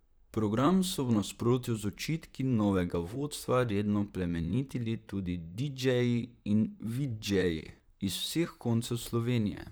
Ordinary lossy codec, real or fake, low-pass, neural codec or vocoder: none; fake; none; vocoder, 44.1 kHz, 128 mel bands, Pupu-Vocoder